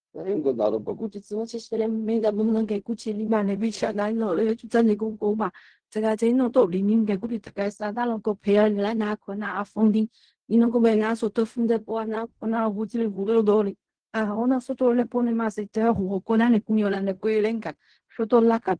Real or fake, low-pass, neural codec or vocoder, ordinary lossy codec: fake; 9.9 kHz; codec, 16 kHz in and 24 kHz out, 0.4 kbps, LongCat-Audio-Codec, fine tuned four codebook decoder; Opus, 16 kbps